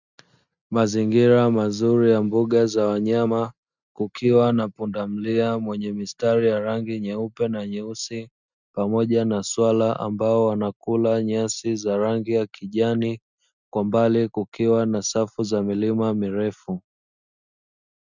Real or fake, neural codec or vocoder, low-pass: real; none; 7.2 kHz